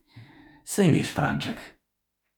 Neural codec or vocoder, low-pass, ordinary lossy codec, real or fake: autoencoder, 48 kHz, 32 numbers a frame, DAC-VAE, trained on Japanese speech; 19.8 kHz; none; fake